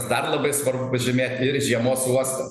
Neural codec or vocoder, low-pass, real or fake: none; 14.4 kHz; real